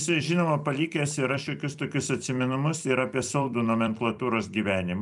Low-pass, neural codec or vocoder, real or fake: 10.8 kHz; vocoder, 44.1 kHz, 128 mel bands every 256 samples, BigVGAN v2; fake